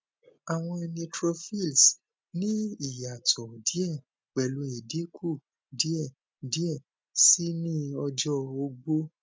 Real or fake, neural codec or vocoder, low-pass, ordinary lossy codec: real; none; none; none